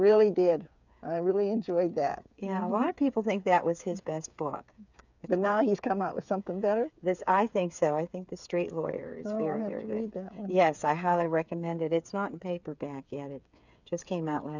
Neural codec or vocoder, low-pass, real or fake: codec, 16 kHz, 8 kbps, FreqCodec, smaller model; 7.2 kHz; fake